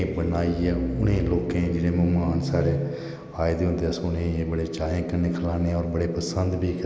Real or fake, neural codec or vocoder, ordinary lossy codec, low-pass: real; none; none; none